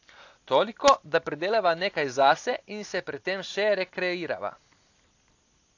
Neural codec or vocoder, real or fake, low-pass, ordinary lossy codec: none; real; 7.2 kHz; AAC, 48 kbps